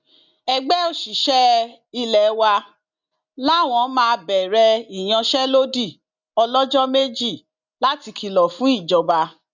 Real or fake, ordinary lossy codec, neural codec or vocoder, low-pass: real; none; none; 7.2 kHz